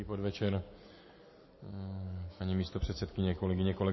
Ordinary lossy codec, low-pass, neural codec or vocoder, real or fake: MP3, 24 kbps; 7.2 kHz; none; real